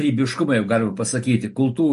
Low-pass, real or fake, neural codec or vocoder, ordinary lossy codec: 14.4 kHz; real; none; MP3, 48 kbps